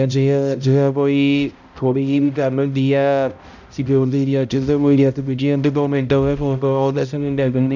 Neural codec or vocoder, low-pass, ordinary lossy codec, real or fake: codec, 16 kHz, 0.5 kbps, X-Codec, HuBERT features, trained on balanced general audio; 7.2 kHz; none; fake